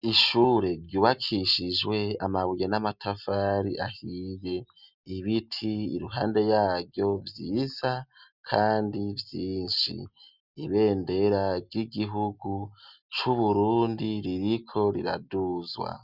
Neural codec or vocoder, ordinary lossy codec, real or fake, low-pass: none; Opus, 64 kbps; real; 5.4 kHz